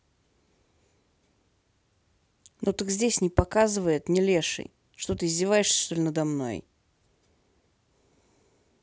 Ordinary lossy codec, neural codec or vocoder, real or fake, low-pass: none; none; real; none